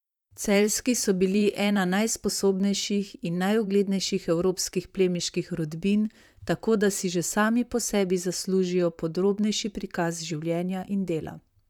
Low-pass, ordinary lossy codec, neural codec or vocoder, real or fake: 19.8 kHz; none; vocoder, 44.1 kHz, 128 mel bands, Pupu-Vocoder; fake